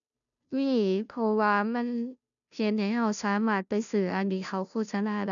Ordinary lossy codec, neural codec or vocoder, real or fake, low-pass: none; codec, 16 kHz, 0.5 kbps, FunCodec, trained on Chinese and English, 25 frames a second; fake; 7.2 kHz